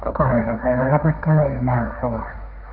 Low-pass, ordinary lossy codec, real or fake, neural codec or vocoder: 5.4 kHz; none; fake; codec, 16 kHz, 1.1 kbps, Voila-Tokenizer